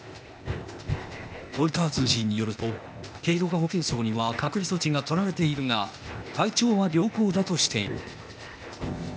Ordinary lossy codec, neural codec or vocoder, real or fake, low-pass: none; codec, 16 kHz, 0.8 kbps, ZipCodec; fake; none